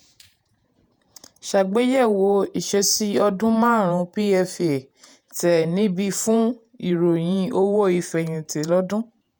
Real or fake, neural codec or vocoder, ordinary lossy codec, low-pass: fake; vocoder, 48 kHz, 128 mel bands, Vocos; none; none